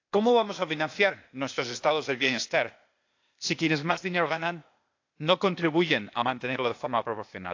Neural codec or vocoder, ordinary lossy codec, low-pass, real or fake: codec, 16 kHz, 0.8 kbps, ZipCodec; AAC, 48 kbps; 7.2 kHz; fake